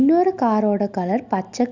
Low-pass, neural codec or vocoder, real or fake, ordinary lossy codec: 7.2 kHz; none; real; Opus, 64 kbps